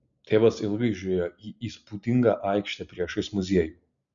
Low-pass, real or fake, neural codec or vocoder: 7.2 kHz; real; none